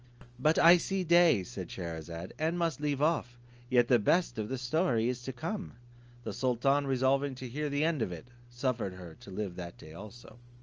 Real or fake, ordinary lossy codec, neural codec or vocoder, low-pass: real; Opus, 24 kbps; none; 7.2 kHz